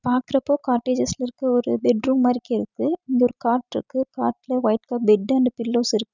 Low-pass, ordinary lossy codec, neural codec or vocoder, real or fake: 7.2 kHz; none; none; real